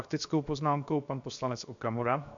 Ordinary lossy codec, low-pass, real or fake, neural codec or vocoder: AAC, 64 kbps; 7.2 kHz; fake; codec, 16 kHz, 0.7 kbps, FocalCodec